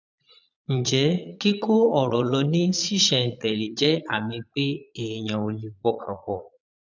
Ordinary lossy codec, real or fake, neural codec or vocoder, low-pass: none; fake; vocoder, 22.05 kHz, 80 mel bands, Vocos; 7.2 kHz